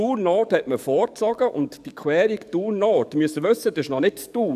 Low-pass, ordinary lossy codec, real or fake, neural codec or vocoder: 14.4 kHz; none; fake; codec, 44.1 kHz, 7.8 kbps, DAC